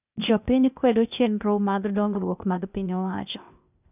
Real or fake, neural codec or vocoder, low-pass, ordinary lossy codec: fake; codec, 16 kHz, 0.8 kbps, ZipCodec; 3.6 kHz; none